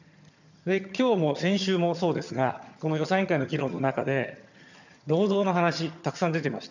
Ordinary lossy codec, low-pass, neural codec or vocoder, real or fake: none; 7.2 kHz; vocoder, 22.05 kHz, 80 mel bands, HiFi-GAN; fake